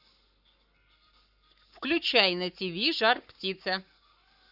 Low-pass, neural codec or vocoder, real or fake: 5.4 kHz; codec, 44.1 kHz, 7.8 kbps, Pupu-Codec; fake